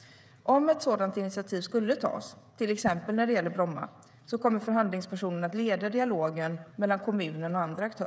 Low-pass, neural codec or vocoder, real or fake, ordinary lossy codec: none; codec, 16 kHz, 16 kbps, FreqCodec, smaller model; fake; none